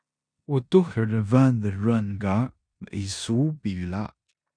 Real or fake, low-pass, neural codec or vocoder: fake; 9.9 kHz; codec, 16 kHz in and 24 kHz out, 0.9 kbps, LongCat-Audio-Codec, four codebook decoder